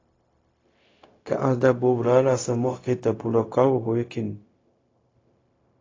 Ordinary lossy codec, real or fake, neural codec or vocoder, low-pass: AAC, 32 kbps; fake; codec, 16 kHz, 0.4 kbps, LongCat-Audio-Codec; 7.2 kHz